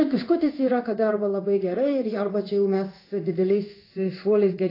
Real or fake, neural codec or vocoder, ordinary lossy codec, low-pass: fake; codec, 16 kHz in and 24 kHz out, 1 kbps, XY-Tokenizer; AAC, 24 kbps; 5.4 kHz